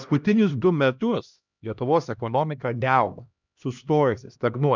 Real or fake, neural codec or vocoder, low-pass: fake; codec, 16 kHz, 1 kbps, X-Codec, HuBERT features, trained on LibriSpeech; 7.2 kHz